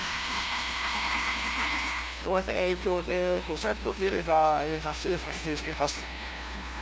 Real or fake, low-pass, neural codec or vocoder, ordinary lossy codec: fake; none; codec, 16 kHz, 0.5 kbps, FunCodec, trained on LibriTTS, 25 frames a second; none